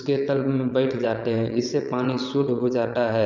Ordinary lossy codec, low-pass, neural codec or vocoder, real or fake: none; 7.2 kHz; vocoder, 22.05 kHz, 80 mel bands, WaveNeXt; fake